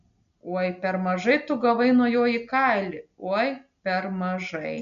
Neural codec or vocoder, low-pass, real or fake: none; 7.2 kHz; real